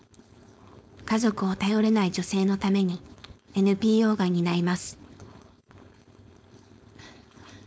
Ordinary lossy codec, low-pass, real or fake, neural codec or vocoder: none; none; fake; codec, 16 kHz, 4.8 kbps, FACodec